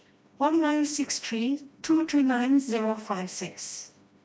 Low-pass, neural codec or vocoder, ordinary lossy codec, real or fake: none; codec, 16 kHz, 1 kbps, FreqCodec, smaller model; none; fake